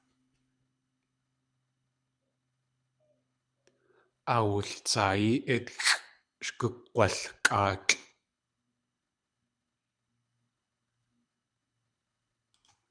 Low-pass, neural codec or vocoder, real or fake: 9.9 kHz; codec, 24 kHz, 6 kbps, HILCodec; fake